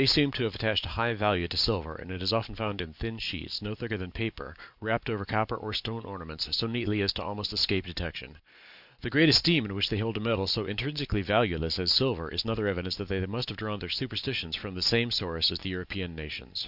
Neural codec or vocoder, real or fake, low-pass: none; real; 5.4 kHz